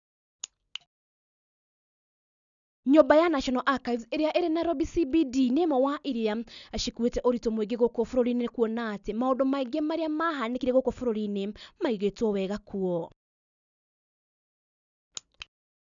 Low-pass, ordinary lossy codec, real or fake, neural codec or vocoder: 7.2 kHz; none; real; none